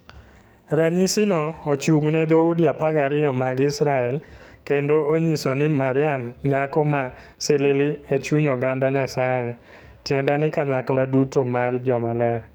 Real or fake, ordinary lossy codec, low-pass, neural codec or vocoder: fake; none; none; codec, 44.1 kHz, 2.6 kbps, SNAC